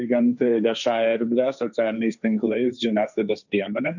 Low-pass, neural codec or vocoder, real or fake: 7.2 kHz; codec, 16 kHz, 1.1 kbps, Voila-Tokenizer; fake